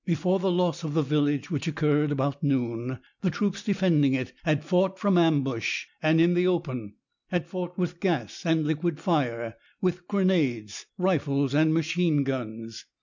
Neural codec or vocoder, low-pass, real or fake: none; 7.2 kHz; real